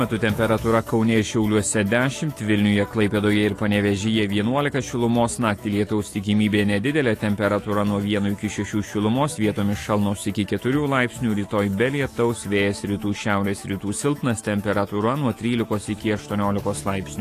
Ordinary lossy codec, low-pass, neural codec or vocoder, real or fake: AAC, 48 kbps; 14.4 kHz; autoencoder, 48 kHz, 128 numbers a frame, DAC-VAE, trained on Japanese speech; fake